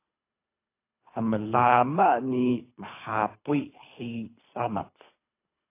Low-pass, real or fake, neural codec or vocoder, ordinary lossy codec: 3.6 kHz; fake; codec, 24 kHz, 3 kbps, HILCodec; AAC, 24 kbps